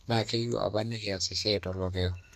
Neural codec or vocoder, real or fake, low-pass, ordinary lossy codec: codec, 32 kHz, 1.9 kbps, SNAC; fake; 14.4 kHz; none